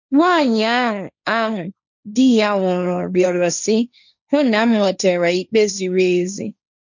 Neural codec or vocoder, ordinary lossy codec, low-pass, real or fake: codec, 16 kHz, 1.1 kbps, Voila-Tokenizer; none; 7.2 kHz; fake